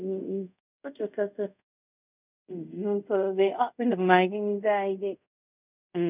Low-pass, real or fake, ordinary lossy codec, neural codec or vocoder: 3.6 kHz; fake; none; codec, 24 kHz, 0.5 kbps, DualCodec